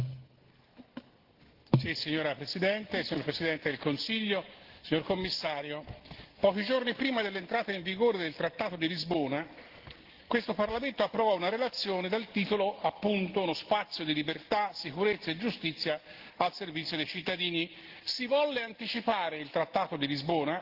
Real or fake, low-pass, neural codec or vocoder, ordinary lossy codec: real; 5.4 kHz; none; Opus, 16 kbps